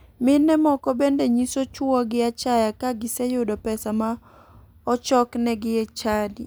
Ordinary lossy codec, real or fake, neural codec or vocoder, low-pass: none; real; none; none